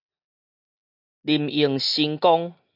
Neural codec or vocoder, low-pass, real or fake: none; 5.4 kHz; real